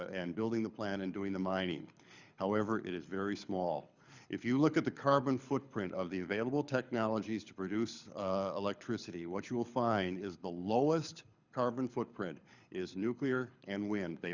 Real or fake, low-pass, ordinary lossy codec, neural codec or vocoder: fake; 7.2 kHz; Opus, 64 kbps; codec, 24 kHz, 6 kbps, HILCodec